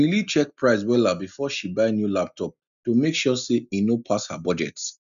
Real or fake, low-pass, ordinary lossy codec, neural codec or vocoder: real; 7.2 kHz; none; none